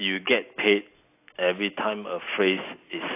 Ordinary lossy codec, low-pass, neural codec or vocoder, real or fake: AAC, 24 kbps; 3.6 kHz; none; real